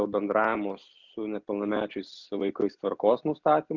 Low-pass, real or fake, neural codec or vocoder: 7.2 kHz; real; none